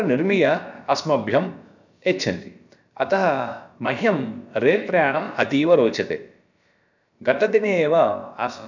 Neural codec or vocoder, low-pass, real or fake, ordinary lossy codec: codec, 16 kHz, about 1 kbps, DyCAST, with the encoder's durations; 7.2 kHz; fake; none